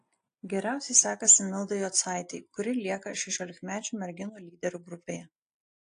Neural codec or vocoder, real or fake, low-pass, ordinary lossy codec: none; real; 9.9 kHz; AAC, 48 kbps